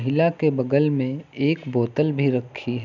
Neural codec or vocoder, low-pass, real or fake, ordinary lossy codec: none; 7.2 kHz; real; none